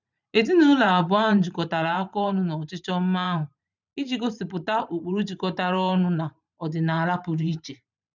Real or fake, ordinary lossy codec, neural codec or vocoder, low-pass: fake; none; vocoder, 44.1 kHz, 128 mel bands every 512 samples, BigVGAN v2; 7.2 kHz